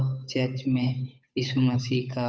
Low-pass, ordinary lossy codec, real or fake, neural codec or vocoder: none; none; fake; codec, 16 kHz, 8 kbps, FunCodec, trained on Chinese and English, 25 frames a second